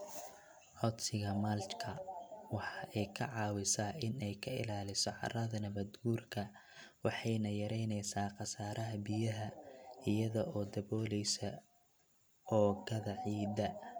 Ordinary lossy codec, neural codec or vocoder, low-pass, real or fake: none; none; none; real